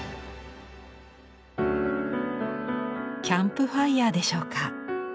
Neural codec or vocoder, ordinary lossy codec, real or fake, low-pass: none; none; real; none